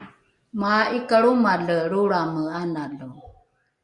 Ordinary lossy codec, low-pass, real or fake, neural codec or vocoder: Opus, 64 kbps; 10.8 kHz; real; none